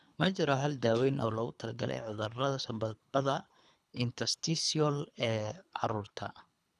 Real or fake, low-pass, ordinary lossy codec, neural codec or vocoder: fake; none; none; codec, 24 kHz, 3 kbps, HILCodec